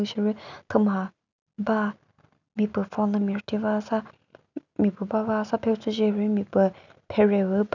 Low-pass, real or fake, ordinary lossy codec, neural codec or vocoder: 7.2 kHz; real; none; none